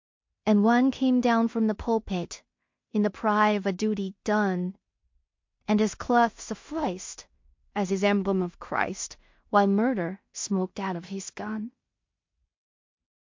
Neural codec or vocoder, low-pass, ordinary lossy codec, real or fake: codec, 16 kHz in and 24 kHz out, 0.4 kbps, LongCat-Audio-Codec, two codebook decoder; 7.2 kHz; MP3, 48 kbps; fake